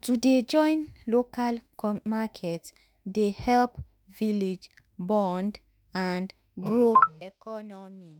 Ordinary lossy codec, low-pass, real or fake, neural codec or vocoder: none; none; fake; autoencoder, 48 kHz, 32 numbers a frame, DAC-VAE, trained on Japanese speech